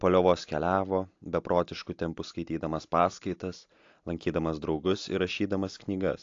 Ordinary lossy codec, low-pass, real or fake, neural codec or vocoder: AAC, 48 kbps; 7.2 kHz; real; none